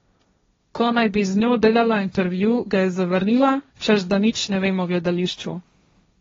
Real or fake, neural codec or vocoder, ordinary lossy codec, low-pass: fake; codec, 16 kHz, 1.1 kbps, Voila-Tokenizer; AAC, 24 kbps; 7.2 kHz